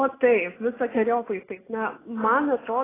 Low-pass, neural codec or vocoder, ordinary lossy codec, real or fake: 3.6 kHz; none; AAC, 16 kbps; real